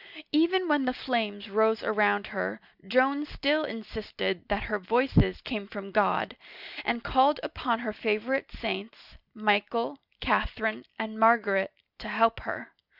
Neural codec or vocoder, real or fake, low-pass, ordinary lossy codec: none; real; 5.4 kHz; Opus, 64 kbps